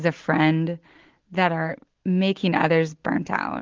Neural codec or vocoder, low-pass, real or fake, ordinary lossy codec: none; 7.2 kHz; real; Opus, 16 kbps